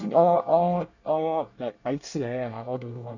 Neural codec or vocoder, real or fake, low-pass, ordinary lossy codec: codec, 24 kHz, 1 kbps, SNAC; fake; 7.2 kHz; none